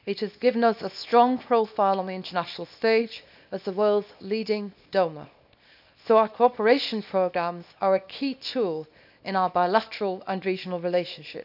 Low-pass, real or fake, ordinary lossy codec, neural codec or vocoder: 5.4 kHz; fake; none; codec, 24 kHz, 0.9 kbps, WavTokenizer, small release